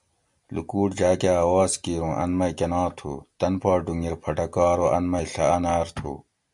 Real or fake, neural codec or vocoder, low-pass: real; none; 10.8 kHz